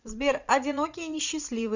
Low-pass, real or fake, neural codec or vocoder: 7.2 kHz; fake; vocoder, 44.1 kHz, 80 mel bands, Vocos